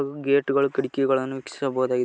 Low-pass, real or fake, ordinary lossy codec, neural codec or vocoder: none; real; none; none